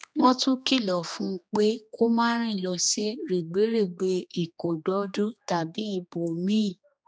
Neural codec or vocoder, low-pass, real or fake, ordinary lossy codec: codec, 16 kHz, 2 kbps, X-Codec, HuBERT features, trained on general audio; none; fake; none